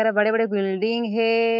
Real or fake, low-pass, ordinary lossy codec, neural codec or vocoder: fake; 5.4 kHz; MP3, 48 kbps; autoencoder, 48 kHz, 128 numbers a frame, DAC-VAE, trained on Japanese speech